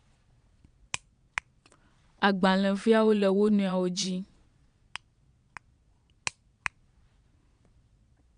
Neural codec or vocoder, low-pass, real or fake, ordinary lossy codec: vocoder, 22.05 kHz, 80 mel bands, Vocos; 9.9 kHz; fake; none